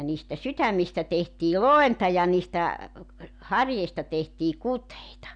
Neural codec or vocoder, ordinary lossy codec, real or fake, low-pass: none; none; real; 9.9 kHz